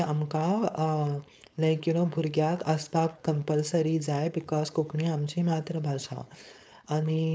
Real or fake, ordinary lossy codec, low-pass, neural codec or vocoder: fake; none; none; codec, 16 kHz, 4.8 kbps, FACodec